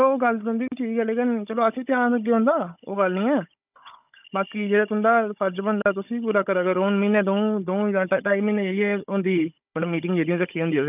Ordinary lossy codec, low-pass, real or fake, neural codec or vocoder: none; 3.6 kHz; fake; codec, 16 kHz, 16 kbps, FunCodec, trained on Chinese and English, 50 frames a second